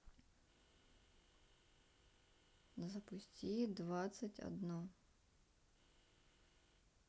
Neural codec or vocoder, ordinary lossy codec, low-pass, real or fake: none; none; none; real